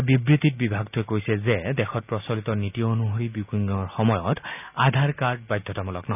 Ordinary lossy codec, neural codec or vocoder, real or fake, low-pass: none; none; real; 3.6 kHz